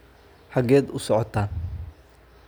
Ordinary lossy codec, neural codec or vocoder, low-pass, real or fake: none; none; none; real